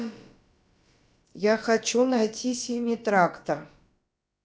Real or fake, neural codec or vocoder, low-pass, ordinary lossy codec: fake; codec, 16 kHz, about 1 kbps, DyCAST, with the encoder's durations; none; none